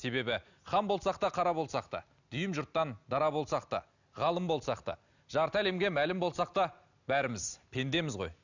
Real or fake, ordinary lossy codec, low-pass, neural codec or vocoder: real; none; 7.2 kHz; none